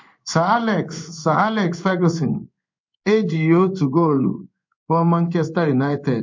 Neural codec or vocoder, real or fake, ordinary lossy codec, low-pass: codec, 16 kHz in and 24 kHz out, 1 kbps, XY-Tokenizer; fake; MP3, 48 kbps; 7.2 kHz